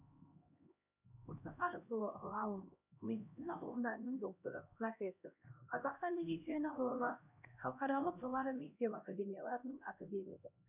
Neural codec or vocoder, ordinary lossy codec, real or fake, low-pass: codec, 16 kHz, 1 kbps, X-Codec, HuBERT features, trained on LibriSpeech; none; fake; 3.6 kHz